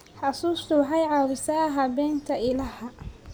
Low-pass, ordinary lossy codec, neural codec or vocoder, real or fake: none; none; none; real